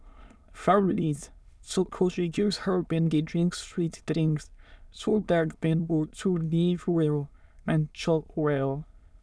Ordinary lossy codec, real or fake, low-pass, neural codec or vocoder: none; fake; none; autoencoder, 22.05 kHz, a latent of 192 numbers a frame, VITS, trained on many speakers